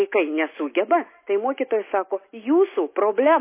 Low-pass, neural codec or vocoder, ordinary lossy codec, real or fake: 3.6 kHz; none; MP3, 24 kbps; real